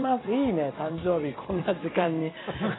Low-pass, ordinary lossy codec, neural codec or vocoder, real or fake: 7.2 kHz; AAC, 16 kbps; vocoder, 44.1 kHz, 128 mel bands every 256 samples, BigVGAN v2; fake